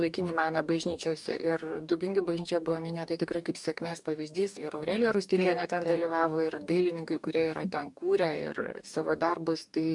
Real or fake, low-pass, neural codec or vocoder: fake; 10.8 kHz; codec, 44.1 kHz, 2.6 kbps, DAC